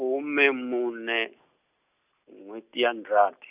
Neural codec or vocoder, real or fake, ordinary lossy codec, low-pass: none; real; none; 3.6 kHz